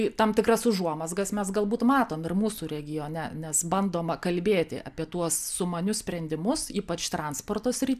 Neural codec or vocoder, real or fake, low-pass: none; real; 14.4 kHz